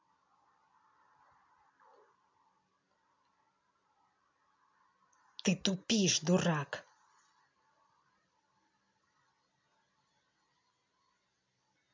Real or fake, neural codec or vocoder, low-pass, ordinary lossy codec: real; none; 7.2 kHz; MP3, 64 kbps